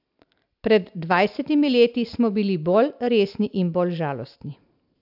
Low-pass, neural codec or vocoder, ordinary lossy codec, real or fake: 5.4 kHz; none; AAC, 48 kbps; real